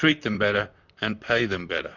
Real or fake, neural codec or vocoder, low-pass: fake; vocoder, 44.1 kHz, 128 mel bands, Pupu-Vocoder; 7.2 kHz